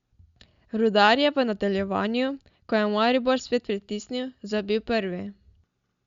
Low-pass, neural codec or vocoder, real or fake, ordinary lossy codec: 7.2 kHz; none; real; Opus, 64 kbps